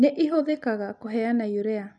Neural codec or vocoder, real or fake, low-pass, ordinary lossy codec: none; real; 10.8 kHz; none